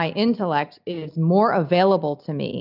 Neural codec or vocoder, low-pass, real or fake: none; 5.4 kHz; real